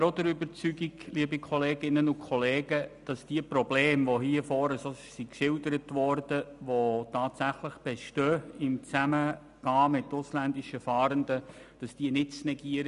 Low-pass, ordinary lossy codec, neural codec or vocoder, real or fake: 10.8 kHz; none; none; real